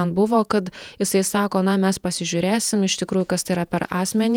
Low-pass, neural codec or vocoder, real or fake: 19.8 kHz; vocoder, 48 kHz, 128 mel bands, Vocos; fake